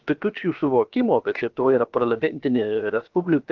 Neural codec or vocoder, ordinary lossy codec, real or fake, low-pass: codec, 16 kHz, 0.7 kbps, FocalCodec; Opus, 32 kbps; fake; 7.2 kHz